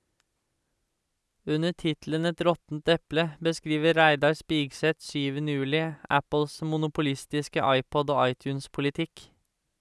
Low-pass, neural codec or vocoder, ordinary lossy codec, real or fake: none; none; none; real